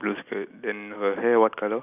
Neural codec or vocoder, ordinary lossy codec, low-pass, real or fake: none; none; 3.6 kHz; real